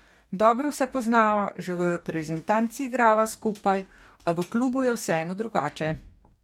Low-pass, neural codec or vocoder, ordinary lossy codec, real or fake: 19.8 kHz; codec, 44.1 kHz, 2.6 kbps, DAC; MP3, 96 kbps; fake